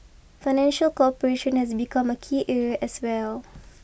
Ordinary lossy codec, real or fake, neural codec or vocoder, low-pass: none; real; none; none